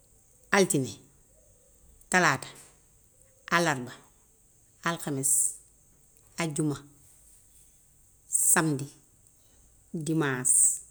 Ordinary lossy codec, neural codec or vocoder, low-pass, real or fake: none; none; none; real